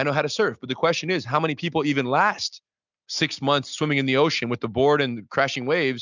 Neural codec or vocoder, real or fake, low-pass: none; real; 7.2 kHz